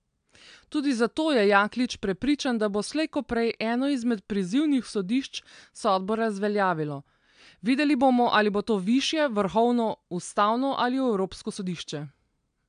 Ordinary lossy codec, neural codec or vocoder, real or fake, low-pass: AAC, 96 kbps; none; real; 9.9 kHz